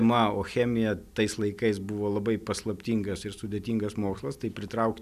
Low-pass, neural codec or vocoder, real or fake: 14.4 kHz; none; real